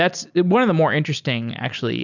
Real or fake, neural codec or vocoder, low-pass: real; none; 7.2 kHz